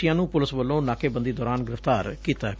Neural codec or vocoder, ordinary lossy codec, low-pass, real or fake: none; none; none; real